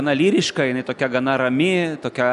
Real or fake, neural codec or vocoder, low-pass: real; none; 10.8 kHz